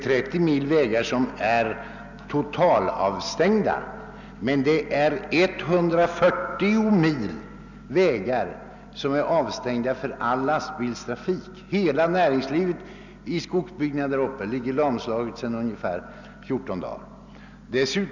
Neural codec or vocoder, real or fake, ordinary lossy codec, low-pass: none; real; none; 7.2 kHz